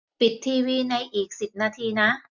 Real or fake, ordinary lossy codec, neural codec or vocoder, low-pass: real; MP3, 64 kbps; none; 7.2 kHz